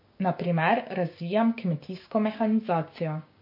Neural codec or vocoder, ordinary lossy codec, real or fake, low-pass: codec, 16 kHz, 6 kbps, DAC; MP3, 32 kbps; fake; 5.4 kHz